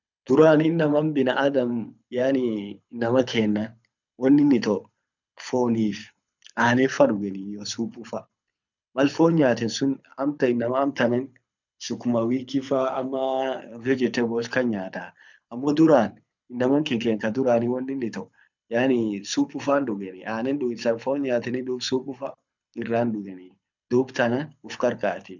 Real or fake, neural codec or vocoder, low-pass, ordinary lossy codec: fake; codec, 24 kHz, 6 kbps, HILCodec; 7.2 kHz; none